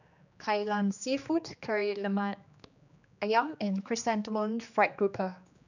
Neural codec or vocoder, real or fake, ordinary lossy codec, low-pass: codec, 16 kHz, 2 kbps, X-Codec, HuBERT features, trained on general audio; fake; none; 7.2 kHz